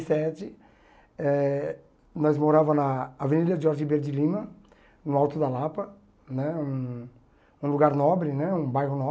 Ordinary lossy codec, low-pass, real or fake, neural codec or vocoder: none; none; real; none